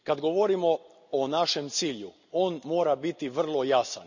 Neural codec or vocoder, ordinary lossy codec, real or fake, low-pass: none; none; real; 7.2 kHz